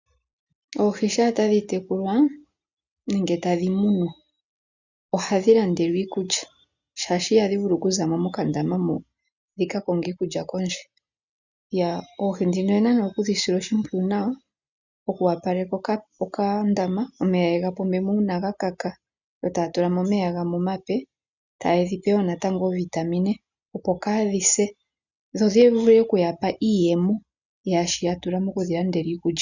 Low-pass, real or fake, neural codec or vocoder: 7.2 kHz; real; none